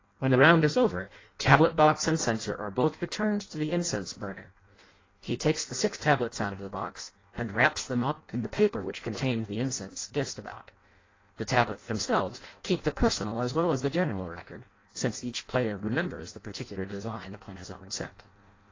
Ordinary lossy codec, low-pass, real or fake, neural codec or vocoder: AAC, 32 kbps; 7.2 kHz; fake; codec, 16 kHz in and 24 kHz out, 0.6 kbps, FireRedTTS-2 codec